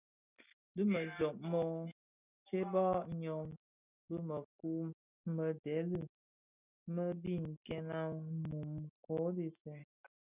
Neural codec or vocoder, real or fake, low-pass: none; real; 3.6 kHz